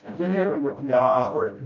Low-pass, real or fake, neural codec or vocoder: 7.2 kHz; fake; codec, 16 kHz, 0.5 kbps, FreqCodec, smaller model